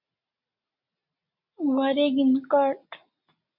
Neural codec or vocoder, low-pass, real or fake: none; 5.4 kHz; real